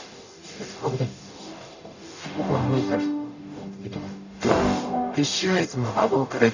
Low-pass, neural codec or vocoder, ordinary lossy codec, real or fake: 7.2 kHz; codec, 44.1 kHz, 0.9 kbps, DAC; none; fake